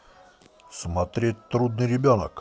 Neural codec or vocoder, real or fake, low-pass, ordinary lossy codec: none; real; none; none